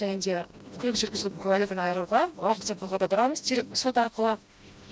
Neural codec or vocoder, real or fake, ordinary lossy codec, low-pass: codec, 16 kHz, 1 kbps, FreqCodec, smaller model; fake; none; none